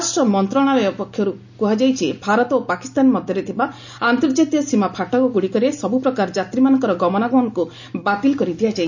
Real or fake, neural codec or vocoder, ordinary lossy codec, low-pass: real; none; none; 7.2 kHz